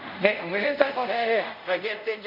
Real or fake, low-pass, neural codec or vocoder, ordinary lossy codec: fake; 5.4 kHz; codec, 24 kHz, 0.5 kbps, DualCodec; none